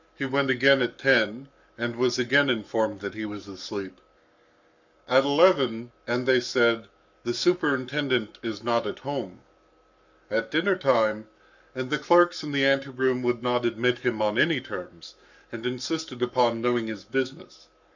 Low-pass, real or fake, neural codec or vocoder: 7.2 kHz; fake; codec, 44.1 kHz, 7.8 kbps, Pupu-Codec